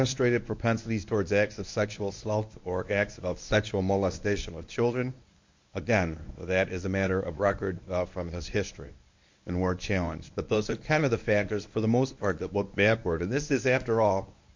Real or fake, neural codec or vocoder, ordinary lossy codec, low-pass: fake; codec, 24 kHz, 0.9 kbps, WavTokenizer, medium speech release version 1; MP3, 48 kbps; 7.2 kHz